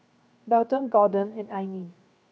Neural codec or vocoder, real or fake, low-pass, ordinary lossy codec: codec, 16 kHz, 0.7 kbps, FocalCodec; fake; none; none